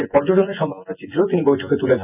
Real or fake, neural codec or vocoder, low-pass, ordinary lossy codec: fake; vocoder, 24 kHz, 100 mel bands, Vocos; 3.6 kHz; none